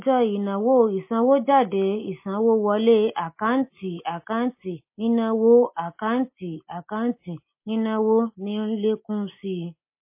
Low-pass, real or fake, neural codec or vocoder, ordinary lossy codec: 3.6 kHz; real; none; MP3, 24 kbps